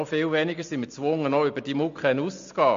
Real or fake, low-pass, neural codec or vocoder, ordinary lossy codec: real; 7.2 kHz; none; MP3, 48 kbps